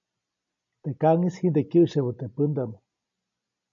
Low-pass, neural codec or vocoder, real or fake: 7.2 kHz; none; real